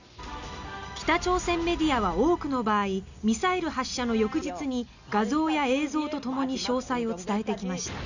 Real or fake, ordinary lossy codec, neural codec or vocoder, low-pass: real; none; none; 7.2 kHz